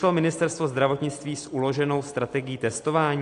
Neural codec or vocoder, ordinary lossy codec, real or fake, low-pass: none; AAC, 48 kbps; real; 10.8 kHz